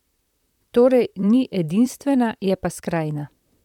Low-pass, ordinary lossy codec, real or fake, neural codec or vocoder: 19.8 kHz; none; fake; vocoder, 44.1 kHz, 128 mel bands, Pupu-Vocoder